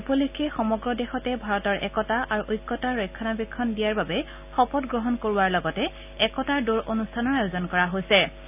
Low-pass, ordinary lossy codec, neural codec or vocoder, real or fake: 3.6 kHz; none; none; real